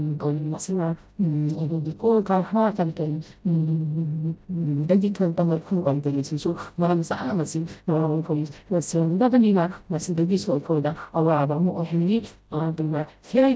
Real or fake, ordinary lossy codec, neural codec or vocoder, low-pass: fake; none; codec, 16 kHz, 0.5 kbps, FreqCodec, smaller model; none